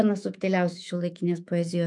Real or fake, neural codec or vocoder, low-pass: fake; autoencoder, 48 kHz, 128 numbers a frame, DAC-VAE, trained on Japanese speech; 10.8 kHz